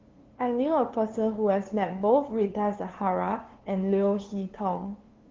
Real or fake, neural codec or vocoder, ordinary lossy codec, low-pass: fake; codec, 16 kHz, 2 kbps, FunCodec, trained on LibriTTS, 25 frames a second; Opus, 16 kbps; 7.2 kHz